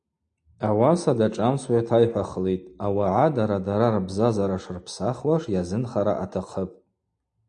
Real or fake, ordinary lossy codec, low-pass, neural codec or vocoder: real; AAC, 64 kbps; 9.9 kHz; none